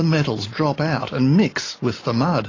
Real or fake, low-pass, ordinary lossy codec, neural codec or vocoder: real; 7.2 kHz; AAC, 32 kbps; none